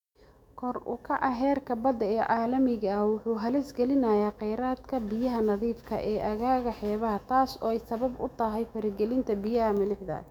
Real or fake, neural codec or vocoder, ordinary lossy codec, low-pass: fake; autoencoder, 48 kHz, 128 numbers a frame, DAC-VAE, trained on Japanese speech; none; 19.8 kHz